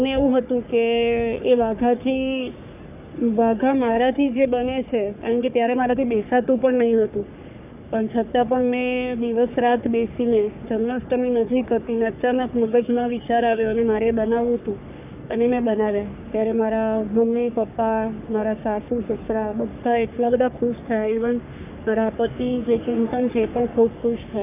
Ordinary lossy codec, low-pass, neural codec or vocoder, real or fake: none; 3.6 kHz; codec, 44.1 kHz, 3.4 kbps, Pupu-Codec; fake